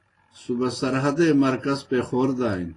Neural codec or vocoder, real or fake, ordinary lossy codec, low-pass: none; real; AAC, 32 kbps; 10.8 kHz